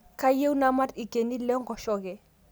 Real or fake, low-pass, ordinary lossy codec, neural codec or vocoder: real; none; none; none